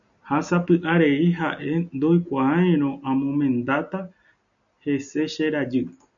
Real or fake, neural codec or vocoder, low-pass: real; none; 7.2 kHz